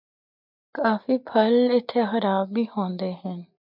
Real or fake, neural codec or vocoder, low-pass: real; none; 5.4 kHz